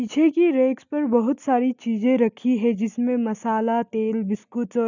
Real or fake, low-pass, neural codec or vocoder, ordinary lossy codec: real; 7.2 kHz; none; none